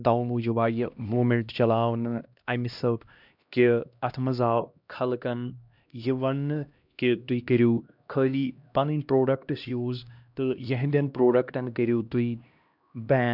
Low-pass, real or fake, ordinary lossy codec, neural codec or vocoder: 5.4 kHz; fake; none; codec, 16 kHz, 1 kbps, X-Codec, HuBERT features, trained on LibriSpeech